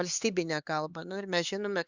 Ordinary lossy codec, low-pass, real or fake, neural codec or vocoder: Opus, 64 kbps; 7.2 kHz; fake; codec, 16 kHz, 4 kbps, X-Codec, HuBERT features, trained on LibriSpeech